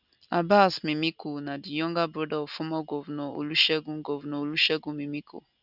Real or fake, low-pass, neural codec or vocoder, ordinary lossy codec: real; 5.4 kHz; none; none